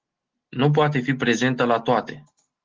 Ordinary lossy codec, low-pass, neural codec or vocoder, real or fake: Opus, 16 kbps; 7.2 kHz; none; real